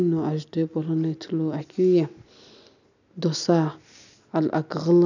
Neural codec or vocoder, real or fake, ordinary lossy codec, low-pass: none; real; none; 7.2 kHz